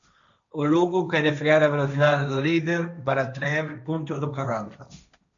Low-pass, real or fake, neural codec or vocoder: 7.2 kHz; fake; codec, 16 kHz, 1.1 kbps, Voila-Tokenizer